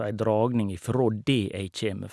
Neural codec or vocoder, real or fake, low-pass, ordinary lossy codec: none; real; none; none